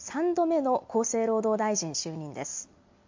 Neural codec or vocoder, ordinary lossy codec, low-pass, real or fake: none; MP3, 48 kbps; 7.2 kHz; real